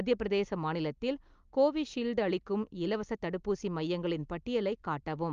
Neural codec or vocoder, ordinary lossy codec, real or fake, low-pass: none; Opus, 32 kbps; real; 7.2 kHz